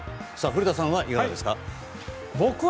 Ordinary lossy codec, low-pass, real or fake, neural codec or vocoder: none; none; real; none